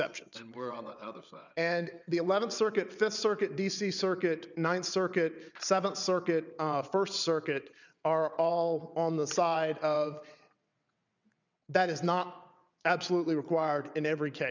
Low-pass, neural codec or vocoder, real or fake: 7.2 kHz; vocoder, 22.05 kHz, 80 mel bands, WaveNeXt; fake